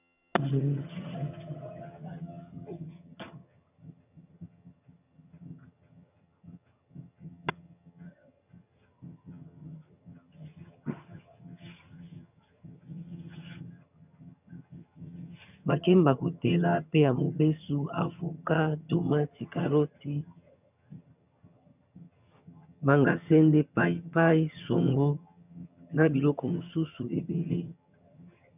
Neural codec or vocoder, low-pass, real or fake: vocoder, 22.05 kHz, 80 mel bands, HiFi-GAN; 3.6 kHz; fake